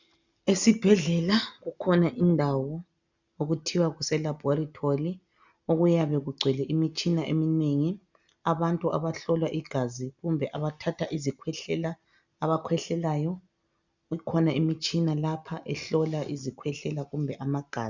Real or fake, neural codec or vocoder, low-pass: real; none; 7.2 kHz